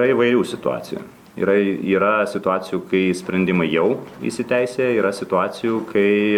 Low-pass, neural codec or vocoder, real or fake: 19.8 kHz; none; real